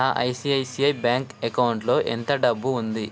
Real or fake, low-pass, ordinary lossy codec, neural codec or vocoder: real; none; none; none